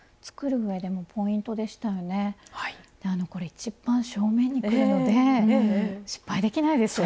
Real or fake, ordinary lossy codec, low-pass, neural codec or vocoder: real; none; none; none